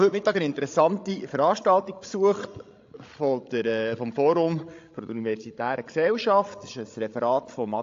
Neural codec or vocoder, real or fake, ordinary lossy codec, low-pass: codec, 16 kHz, 16 kbps, FreqCodec, larger model; fake; AAC, 48 kbps; 7.2 kHz